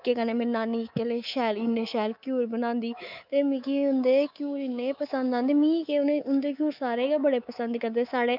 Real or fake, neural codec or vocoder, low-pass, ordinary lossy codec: fake; codec, 24 kHz, 3.1 kbps, DualCodec; 5.4 kHz; none